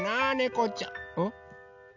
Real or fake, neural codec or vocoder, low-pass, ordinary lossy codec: real; none; 7.2 kHz; none